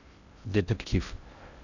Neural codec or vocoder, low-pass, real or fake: codec, 16 kHz in and 24 kHz out, 0.6 kbps, FocalCodec, streaming, 2048 codes; 7.2 kHz; fake